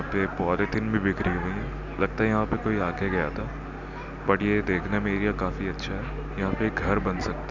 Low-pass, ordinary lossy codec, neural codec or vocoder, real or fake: 7.2 kHz; none; none; real